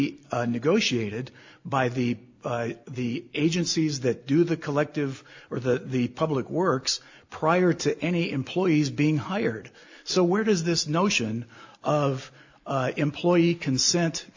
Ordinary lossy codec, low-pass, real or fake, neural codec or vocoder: AAC, 48 kbps; 7.2 kHz; real; none